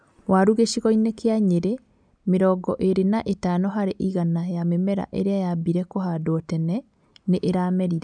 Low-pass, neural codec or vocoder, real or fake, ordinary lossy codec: 9.9 kHz; none; real; none